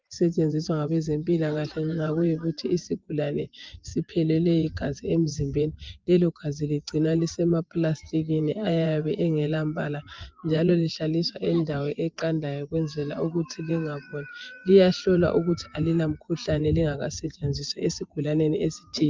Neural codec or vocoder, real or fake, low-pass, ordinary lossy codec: vocoder, 24 kHz, 100 mel bands, Vocos; fake; 7.2 kHz; Opus, 24 kbps